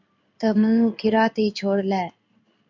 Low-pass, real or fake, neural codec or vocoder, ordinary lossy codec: 7.2 kHz; fake; codec, 16 kHz in and 24 kHz out, 1 kbps, XY-Tokenizer; MP3, 64 kbps